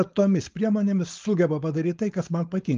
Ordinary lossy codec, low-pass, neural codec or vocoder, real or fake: Opus, 24 kbps; 7.2 kHz; codec, 16 kHz, 4.8 kbps, FACodec; fake